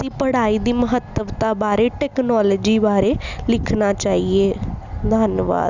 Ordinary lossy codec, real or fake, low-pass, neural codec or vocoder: none; real; 7.2 kHz; none